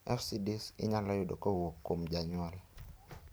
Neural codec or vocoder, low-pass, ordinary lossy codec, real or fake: none; none; none; real